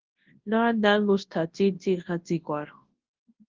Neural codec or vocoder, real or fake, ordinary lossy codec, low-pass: codec, 24 kHz, 0.9 kbps, WavTokenizer, large speech release; fake; Opus, 16 kbps; 7.2 kHz